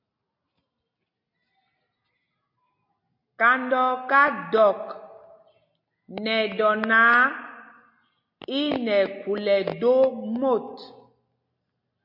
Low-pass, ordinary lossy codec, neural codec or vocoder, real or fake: 5.4 kHz; MP3, 32 kbps; none; real